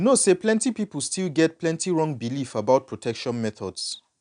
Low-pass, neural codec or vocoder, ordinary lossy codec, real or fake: 9.9 kHz; none; none; real